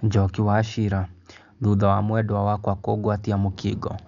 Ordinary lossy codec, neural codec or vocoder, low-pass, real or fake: none; none; 7.2 kHz; real